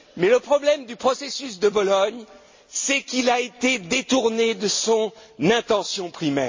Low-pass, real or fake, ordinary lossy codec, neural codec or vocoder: 7.2 kHz; real; MP3, 32 kbps; none